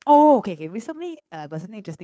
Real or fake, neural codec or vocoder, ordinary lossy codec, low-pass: fake; codec, 16 kHz, 2 kbps, FunCodec, trained on Chinese and English, 25 frames a second; none; none